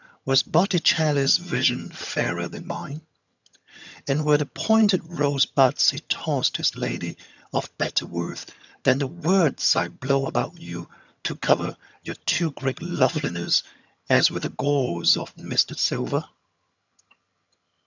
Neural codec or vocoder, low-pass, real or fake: vocoder, 22.05 kHz, 80 mel bands, HiFi-GAN; 7.2 kHz; fake